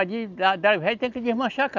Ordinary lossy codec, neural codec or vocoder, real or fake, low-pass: none; none; real; 7.2 kHz